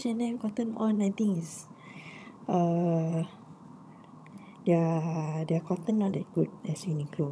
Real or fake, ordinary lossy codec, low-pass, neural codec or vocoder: fake; none; none; vocoder, 22.05 kHz, 80 mel bands, HiFi-GAN